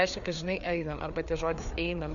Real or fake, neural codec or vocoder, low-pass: fake; codec, 16 kHz, 4 kbps, FreqCodec, larger model; 7.2 kHz